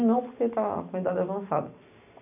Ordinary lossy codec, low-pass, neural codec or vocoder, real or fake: none; 3.6 kHz; none; real